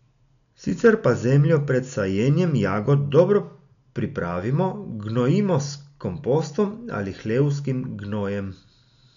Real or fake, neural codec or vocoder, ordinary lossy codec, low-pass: real; none; none; 7.2 kHz